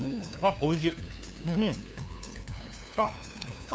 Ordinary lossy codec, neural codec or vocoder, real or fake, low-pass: none; codec, 16 kHz, 2 kbps, FunCodec, trained on LibriTTS, 25 frames a second; fake; none